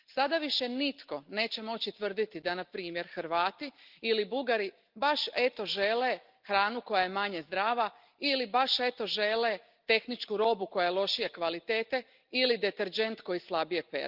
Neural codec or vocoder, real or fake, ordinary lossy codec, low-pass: none; real; Opus, 32 kbps; 5.4 kHz